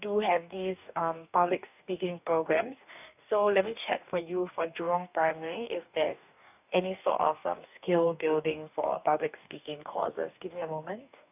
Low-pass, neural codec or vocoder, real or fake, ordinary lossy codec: 3.6 kHz; codec, 44.1 kHz, 2.6 kbps, DAC; fake; none